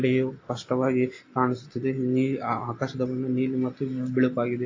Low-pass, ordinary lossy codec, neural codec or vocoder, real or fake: 7.2 kHz; AAC, 32 kbps; none; real